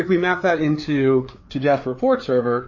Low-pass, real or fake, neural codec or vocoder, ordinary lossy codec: 7.2 kHz; fake; codec, 16 kHz, 4 kbps, FreqCodec, larger model; MP3, 32 kbps